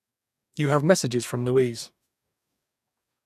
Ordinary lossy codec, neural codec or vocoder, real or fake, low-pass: none; codec, 44.1 kHz, 2.6 kbps, DAC; fake; 14.4 kHz